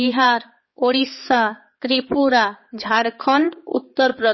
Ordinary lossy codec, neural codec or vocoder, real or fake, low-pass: MP3, 24 kbps; codec, 16 kHz, 4 kbps, X-Codec, HuBERT features, trained on balanced general audio; fake; 7.2 kHz